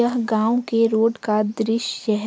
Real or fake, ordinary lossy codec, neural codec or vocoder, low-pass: real; none; none; none